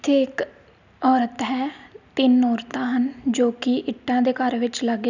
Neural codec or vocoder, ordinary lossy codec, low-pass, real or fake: none; none; 7.2 kHz; real